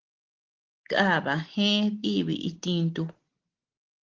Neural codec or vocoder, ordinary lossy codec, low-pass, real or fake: none; Opus, 16 kbps; 7.2 kHz; real